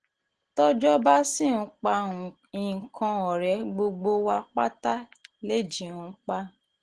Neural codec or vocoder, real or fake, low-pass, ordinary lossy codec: none; real; 10.8 kHz; Opus, 16 kbps